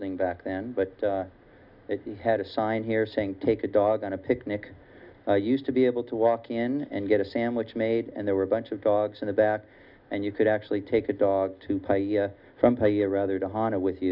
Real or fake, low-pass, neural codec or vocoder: real; 5.4 kHz; none